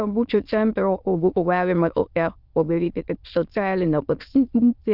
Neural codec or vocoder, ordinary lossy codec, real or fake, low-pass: autoencoder, 22.05 kHz, a latent of 192 numbers a frame, VITS, trained on many speakers; Opus, 24 kbps; fake; 5.4 kHz